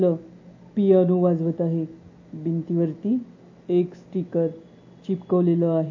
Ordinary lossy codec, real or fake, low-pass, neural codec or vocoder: MP3, 32 kbps; real; 7.2 kHz; none